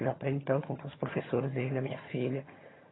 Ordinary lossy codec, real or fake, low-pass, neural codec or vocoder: AAC, 16 kbps; fake; 7.2 kHz; vocoder, 22.05 kHz, 80 mel bands, HiFi-GAN